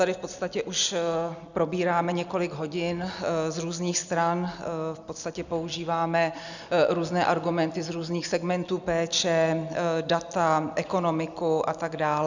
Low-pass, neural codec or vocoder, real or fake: 7.2 kHz; none; real